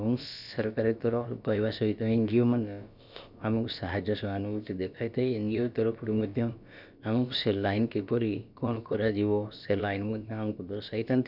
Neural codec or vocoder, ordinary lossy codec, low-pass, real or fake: codec, 16 kHz, about 1 kbps, DyCAST, with the encoder's durations; none; 5.4 kHz; fake